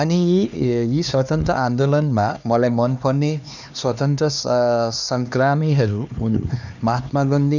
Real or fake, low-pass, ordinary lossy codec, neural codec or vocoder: fake; 7.2 kHz; none; codec, 16 kHz, 2 kbps, X-Codec, HuBERT features, trained on LibriSpeech